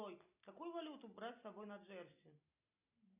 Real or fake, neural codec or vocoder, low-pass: real; none; 3.6 kHz